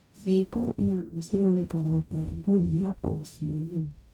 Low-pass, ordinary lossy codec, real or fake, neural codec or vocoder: 19.8 kHz; none; fake; codec, 44.1 kHz, 0.9 kbps, DAC